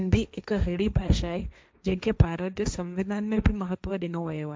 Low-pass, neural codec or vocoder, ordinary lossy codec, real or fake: none; codec, 16 kHz, 1.1 kbps, Voila-Tokenizer; none; fake